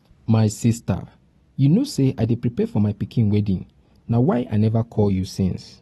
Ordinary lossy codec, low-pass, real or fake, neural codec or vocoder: AAC, 32 kbps; 19.8 kHz; real; none